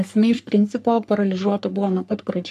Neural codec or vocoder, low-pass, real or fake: codec, 44.1 kHz, 3.4 kbps, Pupu-Codec; 14.4 kHz; fake